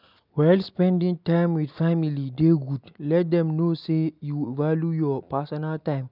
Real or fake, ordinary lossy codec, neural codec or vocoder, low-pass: real; none; none; 5.4 kHz